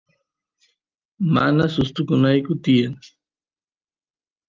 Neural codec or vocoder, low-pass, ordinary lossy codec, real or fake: none; 7.2 kHz; Opus, 32 kbps; real